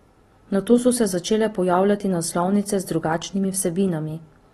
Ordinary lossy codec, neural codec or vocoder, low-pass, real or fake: AAC, 32 kbps; none; 19.8 kHz; real